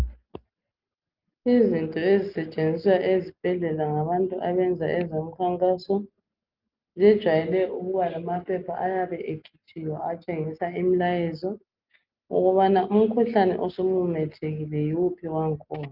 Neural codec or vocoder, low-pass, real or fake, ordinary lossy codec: none; 5.4 kHz; real; Opus, 24 kbps